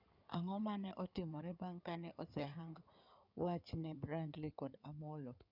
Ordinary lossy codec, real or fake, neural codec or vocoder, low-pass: none; fake; codec, 16 kHz in and 24 kHz out, 2.2 kbps, FireRedTTS-2 codec; 5.4 kHz